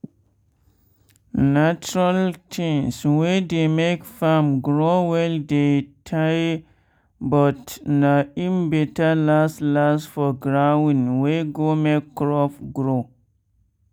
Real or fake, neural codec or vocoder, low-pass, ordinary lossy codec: real; none; 19.8 kHz; none